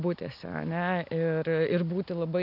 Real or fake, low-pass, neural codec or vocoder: real; 5.4 kHz; none